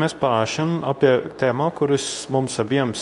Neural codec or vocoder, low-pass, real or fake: codec, 24 kHz, 0.9 kbps, WavTokenizer, medium speech release version 2; 10.8 kHz; fake